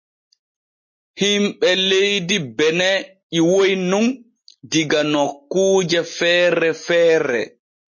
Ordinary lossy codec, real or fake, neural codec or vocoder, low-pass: MP3, 32 kbps; real; none; 7.2 kHz